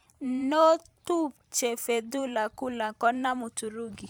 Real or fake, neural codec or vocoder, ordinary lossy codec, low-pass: fake; vocoder, 44.1 kHz, 128 mel bands every 512 samples, BigVGAN v2; none; none